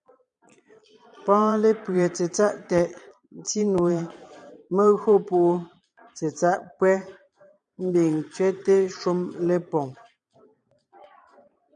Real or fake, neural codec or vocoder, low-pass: fake; vocoder, 22.05 kHz, 80 mel bands, Vocos; 9.9 kHz